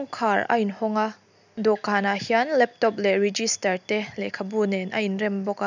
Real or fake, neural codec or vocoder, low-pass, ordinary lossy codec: real; none; 7.2 kHz; none